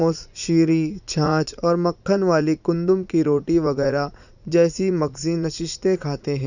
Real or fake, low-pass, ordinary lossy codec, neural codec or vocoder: fake; 7.2 kHz; none; vocoder, 44.1 kHz, 80 mel bands, Vocos